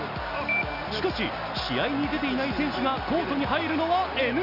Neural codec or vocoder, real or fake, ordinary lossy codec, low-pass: none; real; none; 5.4 kHz